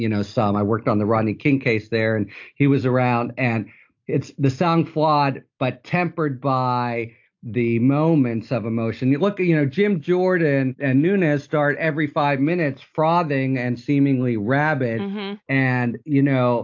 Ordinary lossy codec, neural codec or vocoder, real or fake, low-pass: AAC, 48 kbps; none; real; 7.2 kHz